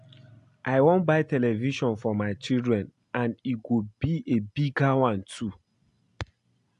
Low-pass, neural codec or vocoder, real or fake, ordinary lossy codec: 10.8 kHz; none; real; AAC, 64 kbps